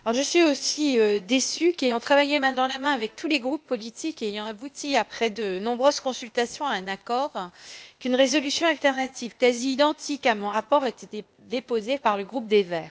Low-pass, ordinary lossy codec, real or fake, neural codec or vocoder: none; none; fake; codec, 16 kHz, 0.8 kbps, ZipCodec